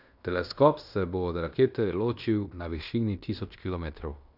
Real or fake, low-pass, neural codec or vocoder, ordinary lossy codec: fake; 5.4 kHz; codec, 16 kHz in and 24 kHz out, 0.9 kbps, LongCat-Audio-Codec, fine tuned four codebook decoder; none